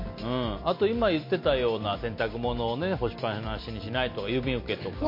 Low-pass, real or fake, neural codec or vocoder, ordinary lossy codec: 5.4 kHz; real; none; MP3, 32 kbps